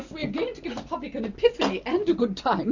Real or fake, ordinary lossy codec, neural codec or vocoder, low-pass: real; Opus, 64 kbps; none; 7.2 kHz